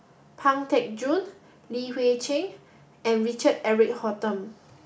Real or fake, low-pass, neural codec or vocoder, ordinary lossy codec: real; none; none; none